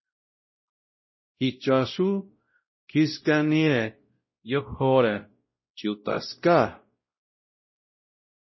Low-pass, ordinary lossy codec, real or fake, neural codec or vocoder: 7.2 kHz; MP3, 24 kbps; fake; codec, 16 kHz, 0.5 kbps, X-Codec, WavLM features, trained on Multilingual LibriSpeech